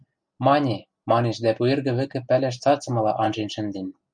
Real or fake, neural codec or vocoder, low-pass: real; none; 9.9 kHz